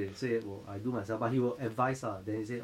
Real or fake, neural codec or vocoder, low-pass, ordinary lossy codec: fake; autoencoder, 48 kHz, 128 numbers a frame, DAC-VAE, trained on Japanese speech; 19.8 kHz; MP3, 64 kbps